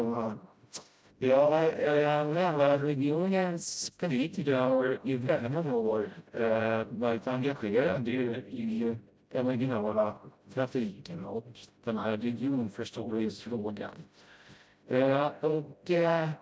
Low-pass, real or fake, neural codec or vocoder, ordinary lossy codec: none; fake; codec, 16 kHz, 0.5 kbps, FreqCodec, smaller model; none